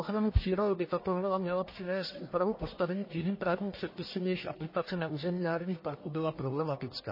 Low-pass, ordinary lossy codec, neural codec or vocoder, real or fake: 5.4 kHz; MP3, 24 kbps; codec, 44.1 kHz, 1.7 kbps, Pupu-Codec; fake